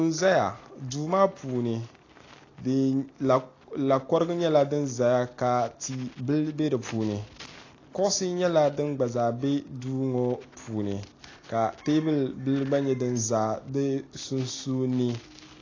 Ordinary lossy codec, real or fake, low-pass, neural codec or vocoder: AAC, 32 kbps; real; 7.2 kHz; none